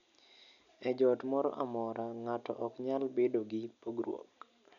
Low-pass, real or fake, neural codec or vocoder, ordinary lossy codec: 7.2 kHz; real; none; none